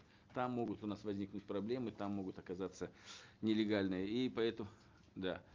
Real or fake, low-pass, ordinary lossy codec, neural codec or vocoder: real; 7.2 kHz; Opus, 24 kbps; none